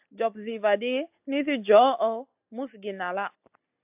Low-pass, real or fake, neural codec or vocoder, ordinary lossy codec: 3.6 kHz; real; none; AAC, 32 kbps